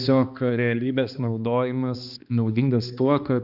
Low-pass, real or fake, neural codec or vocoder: 5.4 kHz; fake; codec, 16 kHz, 2 kbps, X-Codec, HuBERT features, trained on balanced general audio